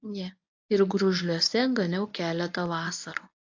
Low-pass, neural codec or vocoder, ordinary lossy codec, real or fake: 7.2 kHz; codec, 24 kHz, 0.9 kbps, WavTokenizer, medium speech release version 1; AAC, 48 kbps; fake